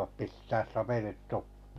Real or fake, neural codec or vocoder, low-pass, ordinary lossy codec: real; none; 14.4 kHz; none